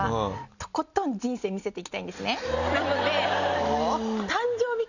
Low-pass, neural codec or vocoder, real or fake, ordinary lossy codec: 7.2 kHz; none; real; none